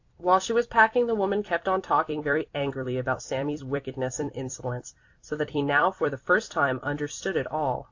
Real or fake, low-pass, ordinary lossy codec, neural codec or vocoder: fake; 7.2 kHz; AAC, 48 kbps; vocoder, 44.1 kHz, 128 mel bands every 256 samples, BigVGAN v2